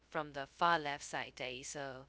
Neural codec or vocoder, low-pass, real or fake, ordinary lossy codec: codec, 16 kHz, 0.2 kbps, FocalCodec; none; fake; none